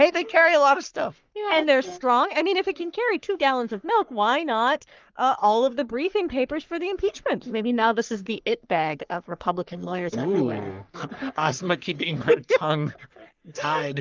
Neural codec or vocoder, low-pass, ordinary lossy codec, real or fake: codec, 44.1 kHz, 3.4 kbps, Pupu-Codec; 7.2 kHz; Opus, 32 kbps; fake